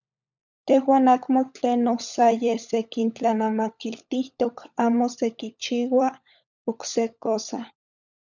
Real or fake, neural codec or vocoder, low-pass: fake; codec, 16 kHz, 16 kbps, FunCodec, trained on LibriTTS, 50 frames a second; 7.2 kHz